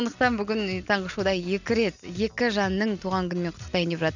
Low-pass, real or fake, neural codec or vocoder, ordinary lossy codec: 7.2 kHz; real; none; none